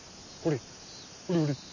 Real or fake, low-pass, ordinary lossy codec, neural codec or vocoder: real; 7.2 kHz; none; none